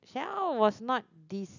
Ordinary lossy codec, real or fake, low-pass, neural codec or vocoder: none; real; 7.2 kHz; none